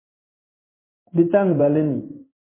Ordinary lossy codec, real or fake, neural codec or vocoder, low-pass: MP3, 16 kbps; fake; codec, 16 kHz in and 24 kHz out, 1 kbps, XY-Tokenizer; 3.6 kHz